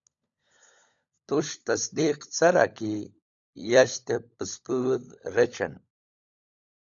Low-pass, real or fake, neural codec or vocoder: 7.2 kHz; fake; codec, 16 kHz, 16 kbps, FunCodec, trained on LibriTTS, 50 frames a second